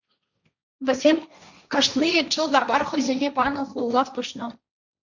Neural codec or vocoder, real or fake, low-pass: codec, 16 kHz, 1.1 kbps, Voila-Tokenizer; fake; 7.2 kHz